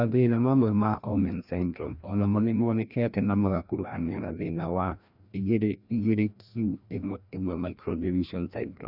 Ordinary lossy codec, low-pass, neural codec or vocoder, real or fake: none; 5.4 kHz; codec, 16 kHz, 1 kbps, FreqCodec, larger model; fake